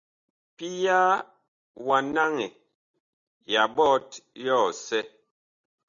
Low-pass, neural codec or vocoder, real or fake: 7.2 kHz; none; real